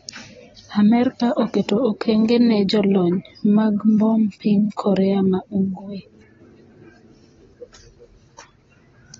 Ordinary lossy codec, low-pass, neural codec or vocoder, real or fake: AAC, 24 kbps; 7.2 kHz; none; real